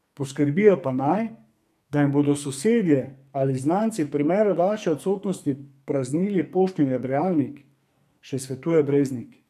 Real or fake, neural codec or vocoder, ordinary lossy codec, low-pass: fake; codec, 44.1 kHz, 2.6 kbps, SNAC; AAC, 96 kbps; 14.4 kHz